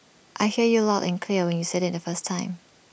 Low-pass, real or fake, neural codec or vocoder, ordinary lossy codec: none; real; none; none